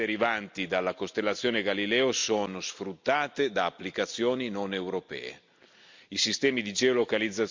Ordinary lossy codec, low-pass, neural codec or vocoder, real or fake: MP3, 64 kbps; 7.2 kHz; none; real